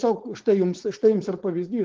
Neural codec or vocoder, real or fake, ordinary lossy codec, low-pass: codec, 16 kHz, 4.8 kbps, FACodec; fake; Opus, 16 kbps; 7.2 kHz